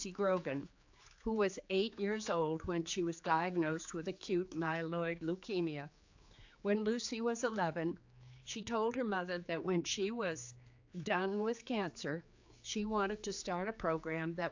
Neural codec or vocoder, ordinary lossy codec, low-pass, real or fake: codec, 16 kHz, 4 kbps, X-Codec, HuBERT features, trained on general audio; AAC, 48 kbps; 7.2 kHz; fake